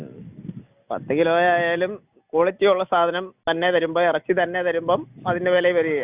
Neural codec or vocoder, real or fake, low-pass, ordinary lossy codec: none; real; 3.6 kHz; Opus, 64 kbps